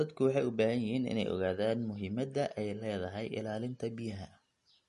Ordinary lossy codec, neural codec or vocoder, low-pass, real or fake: MP3, 48 kbps; none; 14.4 kHz; real